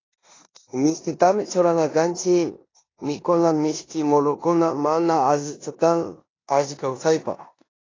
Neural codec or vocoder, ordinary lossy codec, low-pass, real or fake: codec, 16 kHz in and 24 kHz out, 0.9 kbps, LongCat-Audio-Codec, four codebook decoder; AAC, 32 kbps; 7.2 kHz; fake